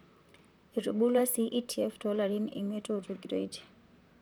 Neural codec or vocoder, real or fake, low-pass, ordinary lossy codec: vocoder, 44.1 kHz, 128 mel bands, Pupu-Vocoder; fake; none; none